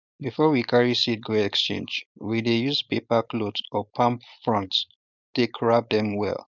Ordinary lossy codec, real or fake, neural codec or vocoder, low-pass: none; fake; codec, 16 kHz, 4.8 kbps, FACodec; 7.2 kHz